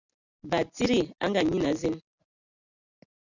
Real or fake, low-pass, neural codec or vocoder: real; 7.2 kHz; none